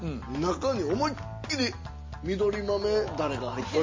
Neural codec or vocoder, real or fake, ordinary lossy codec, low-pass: none; real; MP3, 32 kbps; 7.2 kHz